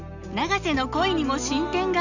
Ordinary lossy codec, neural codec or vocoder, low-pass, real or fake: none; none; 7.2 kHz; real